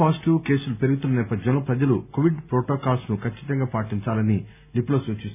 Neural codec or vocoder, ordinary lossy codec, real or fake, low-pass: none; none; real; 3.6 kHz